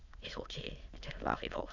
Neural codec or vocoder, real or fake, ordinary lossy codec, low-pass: autoencoder, 22.05 kHz, a latent of 192 numbers a frame, VITS, trained on many speakers; fake; Opus, 64 kbps; 7.2 kHz